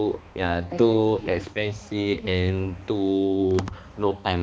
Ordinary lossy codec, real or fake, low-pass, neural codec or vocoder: none; fake; none; codec, 16 kHz, 2 kbps, X-Codec, HuBERT features, trained on balanced general audio